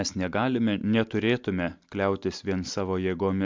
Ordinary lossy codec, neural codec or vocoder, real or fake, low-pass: MP3, 64 kbps; none; real; 7.2 kHz